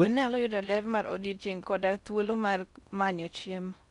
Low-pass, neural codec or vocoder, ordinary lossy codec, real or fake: 10.8 kHz; codec, 16 kHz in and 24 kHz out, 0.6 kbps, FocalCodec, streaming, 4096 codes; none; fake